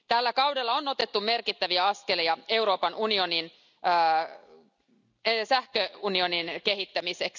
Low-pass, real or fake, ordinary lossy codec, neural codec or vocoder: 7.2 kHz; real; none; none